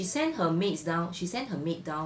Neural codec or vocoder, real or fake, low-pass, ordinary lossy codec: none; real; none; none